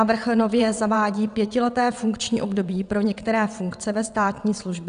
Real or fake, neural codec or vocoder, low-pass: fake; vocoder, 22.05 kHz, 80 mel bands, WaveNeXt; 9.9 kHz